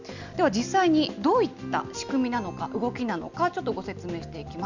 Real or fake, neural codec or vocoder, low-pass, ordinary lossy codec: real; none; 7.2 kHz; none